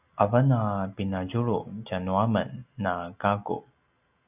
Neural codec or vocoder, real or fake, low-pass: none; real; 3.6 kHz